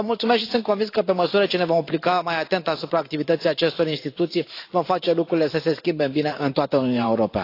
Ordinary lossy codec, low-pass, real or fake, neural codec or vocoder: AAC, 32 kbps; 5.4 kHz; fake; vocoder, 44.1 kHz, 128 mel bands every 512 samples, BigVGAN v2